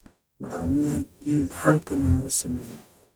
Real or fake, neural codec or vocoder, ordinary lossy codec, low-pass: fake; codec, 44.1 kHz, 0.9 kbps, DAC; none; none